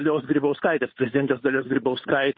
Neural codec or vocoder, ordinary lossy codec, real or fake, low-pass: codec, 16 kHz, 8 kbps, FunCodec, trained on Chinese and English, 25 frames a second; MP3, 24 kbps; fake; 7.2 kHz